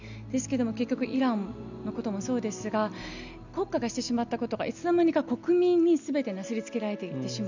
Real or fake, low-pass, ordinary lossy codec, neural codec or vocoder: real; 7.2 kHz; none; none